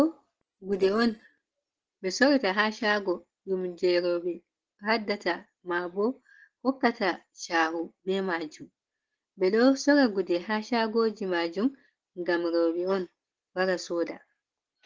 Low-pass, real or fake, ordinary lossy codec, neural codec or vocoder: 7.2 kHz; real; Opus, 16 kbps; none